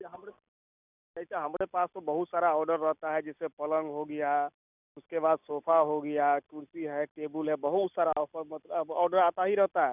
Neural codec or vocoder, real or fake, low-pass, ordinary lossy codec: none; real; 3.6 kHz; none